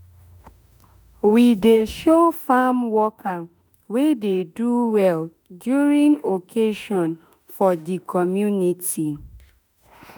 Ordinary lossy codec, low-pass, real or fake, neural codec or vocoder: none; none; fake; autoencoder, 48 kHz, 32 numbers a frame, DAC-VAE, trained on Japanese speech